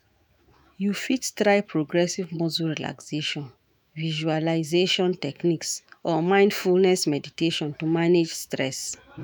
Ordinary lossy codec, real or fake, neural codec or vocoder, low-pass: none; fake; autoencoder, 48 kHz, 128 numbers a frame, DAC-VAE, trained on Japanese speech; none